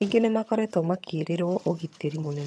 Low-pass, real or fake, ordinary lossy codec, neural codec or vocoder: none; fake; none; vocoder, 22.05 kHz, 80 mel bands, HiFi-GAN